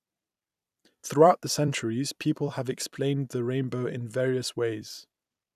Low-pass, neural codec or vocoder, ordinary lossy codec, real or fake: 14.4 kHz; vocoder, 44.1 kHz, 128 mel bands every 256 samples, BigVGAN v2; none; fake